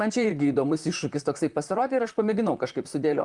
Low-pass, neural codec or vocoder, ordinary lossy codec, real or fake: 10.8 kHz; vocoder, 44.1 kHz, 128 mel bands, Pupu-Vocoder; Opus, 32 kbps; fake